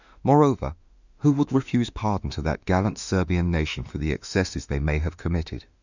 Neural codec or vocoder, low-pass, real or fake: autoencoder, 48 kHz, 32 numbers a frame, DAC-VAE, trained on Japanese speech; 7.2 kHz; fake